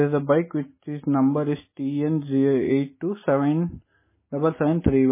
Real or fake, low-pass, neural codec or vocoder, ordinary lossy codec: real; 3.6 kHz; none; MP3, 16 kbps